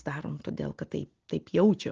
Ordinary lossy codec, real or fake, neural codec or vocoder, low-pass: Opus, 16 kbps; real; none; 7.2 kHz